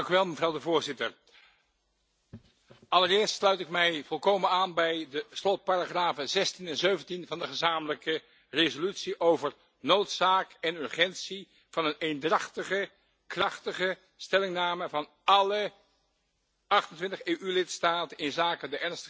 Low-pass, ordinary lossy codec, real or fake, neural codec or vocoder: none; none; real; none